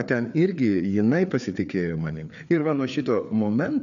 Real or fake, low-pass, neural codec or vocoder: fake; 7.2 kHz; codec, 16 kHz, 4 kbps, FreqCodec, larger model